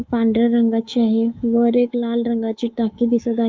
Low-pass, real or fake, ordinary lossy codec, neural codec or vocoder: 7.2 kHz; fake; Opus, 32 kbps; codec, 24 kHz, 3.1 kbps, DualCodec